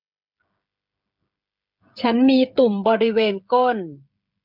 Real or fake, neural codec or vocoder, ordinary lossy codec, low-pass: fake; codec, 16 kHz, 16 kbps, FreqCodec, smaller model; MP3, 48 kbps; 5.4 kHz